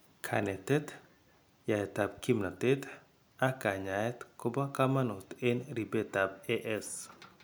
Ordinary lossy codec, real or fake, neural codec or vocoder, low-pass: none; real; none; none